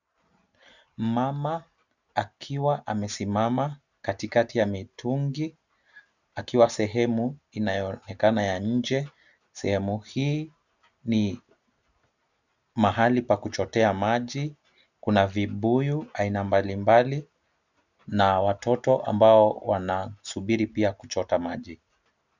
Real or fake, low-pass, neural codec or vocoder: real; 7.2 kHz; none